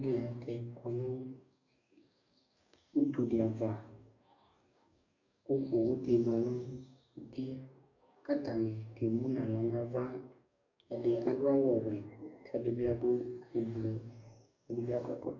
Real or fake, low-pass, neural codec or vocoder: fake; 7.2 kHz; codec, 44.1 kHz, 2.6 kbps, DAC